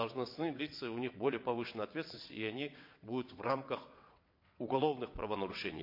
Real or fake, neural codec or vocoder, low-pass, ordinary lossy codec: fake; vocoder, 22.05 kHz, 80 mel bands, WaveNeXt; 5.4 kHz; MP3, 32 kbps